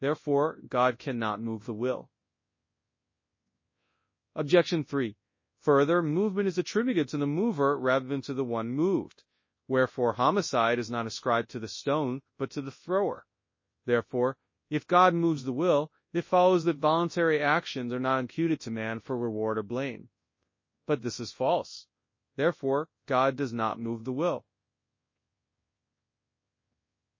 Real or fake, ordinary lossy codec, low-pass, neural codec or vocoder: fake; MP3, 32 kbps; 7.2 kHz; codec, 24 kHz, 0.9 kbps, WavTokenizer, large speech release